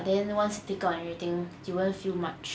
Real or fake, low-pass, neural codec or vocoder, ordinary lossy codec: real; none; none; none